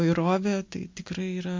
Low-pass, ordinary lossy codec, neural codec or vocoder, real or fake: 7.2 kHz; MP3, 48 kbps; none; real